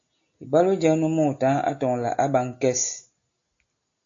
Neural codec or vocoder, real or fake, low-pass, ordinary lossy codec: none; real; 7.2 kHz; AAC, 48 kbps